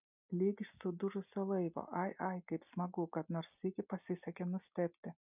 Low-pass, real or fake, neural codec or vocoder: 3.6 kHz; real; none